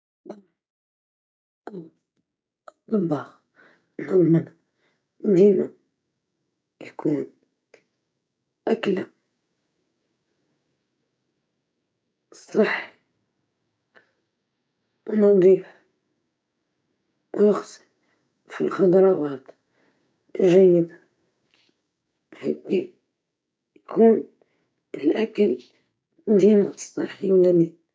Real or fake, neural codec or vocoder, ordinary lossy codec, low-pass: fake; codec, 16 kHz, 6 kbps, DAC; none; none